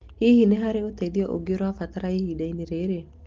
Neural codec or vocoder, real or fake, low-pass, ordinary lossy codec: none; real; 7.2 kHz; Opus, 16 kbps